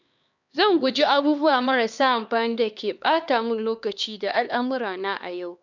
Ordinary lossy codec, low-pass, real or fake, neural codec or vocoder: none; 7.2 kHz; fake; codec, 16 kHz, 2 kbps, X-Codec, HuBERT features, trained on LibriSpeech